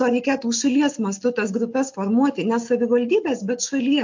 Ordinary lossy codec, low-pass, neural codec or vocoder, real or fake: MP3, 48 kbps; 7.2 kHz; none; real